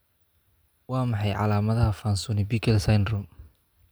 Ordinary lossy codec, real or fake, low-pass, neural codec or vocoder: none; real; none; none